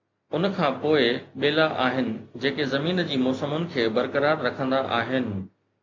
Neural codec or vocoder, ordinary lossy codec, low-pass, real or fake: none; AAC, 48 kbps; 7.2 kHz; real